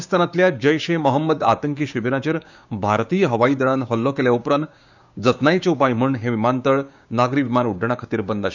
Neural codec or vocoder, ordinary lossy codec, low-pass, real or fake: codec, 16 kHz, 6 kbps, DAC; none; 7.2 kHz; fake